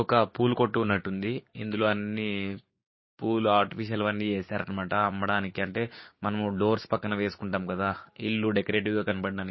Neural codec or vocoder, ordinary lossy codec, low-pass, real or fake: codec, 16 kHz, 6 kbps, DAC; MP3, 24 kbps; 7.2 kHz; fake